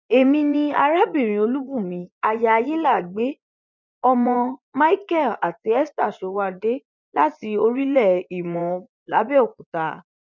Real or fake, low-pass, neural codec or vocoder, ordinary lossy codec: fake; 7.2 kHz; vocoder, 24 kHz, 100 mel bands, Vocos; none